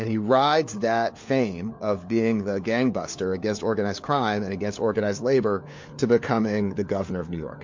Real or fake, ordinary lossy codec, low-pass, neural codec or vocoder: fake; MP3, 48 kbps; 7.2 kHz; codec, 16 kHz, 4 kbps, FunCodec, trained on LibriTTS, 50 frames a second